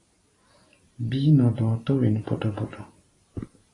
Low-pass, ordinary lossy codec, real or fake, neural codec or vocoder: 10.8 kHz; AAC, 32 kbps; fake; vocoder, 44.1 kHz, 128 mel bands every 512 samples, BigVGAN v2